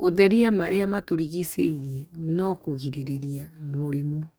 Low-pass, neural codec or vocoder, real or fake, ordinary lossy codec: none; codec, 44.1 kHz, 2.6 kbps, DAC; fake; none